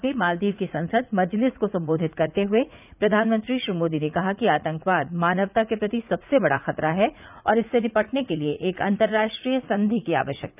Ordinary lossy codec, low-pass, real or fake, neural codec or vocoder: none; 3.6 kHz; fake; vocoder, 44.1 kHz, 80 mel bands, Vocos